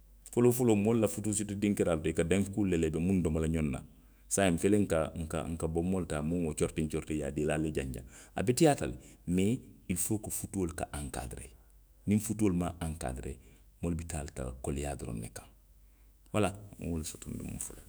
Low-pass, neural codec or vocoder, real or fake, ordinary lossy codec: none; autoencoder, 48 kHz, 128 numbers a frame, DAC-VAE, trained on Japanese speech; fake; none